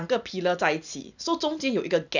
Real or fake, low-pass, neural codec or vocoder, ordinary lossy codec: real; 7.2 kHz; none; none